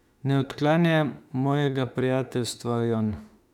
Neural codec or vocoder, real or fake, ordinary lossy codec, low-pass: autoencoder, 48 kHz, 32 numbers a frame, DAC-VAE, trained on Japanese speech; fake; none; 19.8 kHz